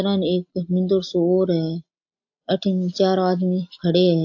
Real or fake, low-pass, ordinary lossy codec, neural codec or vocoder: real; 7.2 kHz; AAC, 48 kbps; none